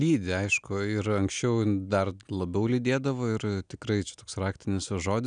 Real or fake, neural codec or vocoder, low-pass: real; none; 9.9 kHz